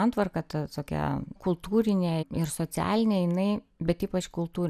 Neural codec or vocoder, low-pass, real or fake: none; 14.4 kHz; real